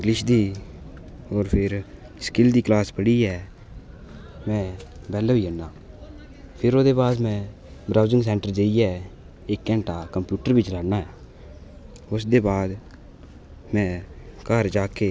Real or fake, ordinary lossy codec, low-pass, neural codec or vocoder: real; none; none; none